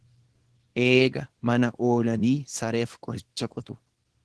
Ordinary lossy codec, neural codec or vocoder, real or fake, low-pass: Opus, 16 kbps; codec, 24 kHz, 0.9 kbps, WavTokenizer, small release; fake; 10.8 kHz